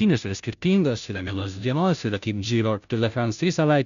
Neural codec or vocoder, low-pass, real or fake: codec, 16 kHz, 0.5 kbps, FunCodec, trained on Chinese and English, 25 frames a second; 7.2 kHz; fake